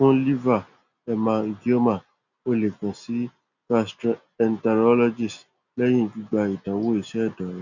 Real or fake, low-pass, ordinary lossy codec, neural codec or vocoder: real; 7.2 kHz; none; none